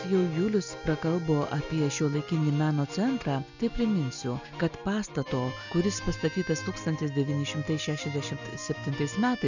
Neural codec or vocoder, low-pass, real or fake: none; 7.2 kHz; real